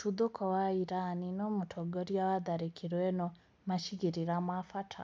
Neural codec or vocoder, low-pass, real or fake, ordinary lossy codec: none; none; real; none